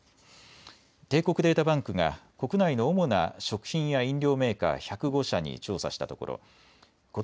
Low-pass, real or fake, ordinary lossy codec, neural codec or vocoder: none; real; none; none